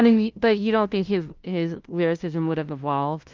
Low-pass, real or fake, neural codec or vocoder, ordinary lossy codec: 7.2 kHz; fake; codec, 16 kHz, 0.5 kbps, FunCodec, trained on LibriTTS, 25 frames a second; Opus, 32 kbps